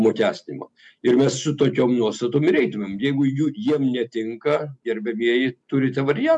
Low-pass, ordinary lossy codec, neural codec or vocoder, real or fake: 10.8 kHz; MP3, 64 kbps; none; real